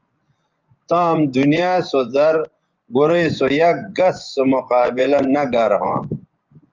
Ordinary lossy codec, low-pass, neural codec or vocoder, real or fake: Opus, 24 kbps; 7.2 kHz; vocoder, 24 kHz, 100 mel bands, Vocos; fake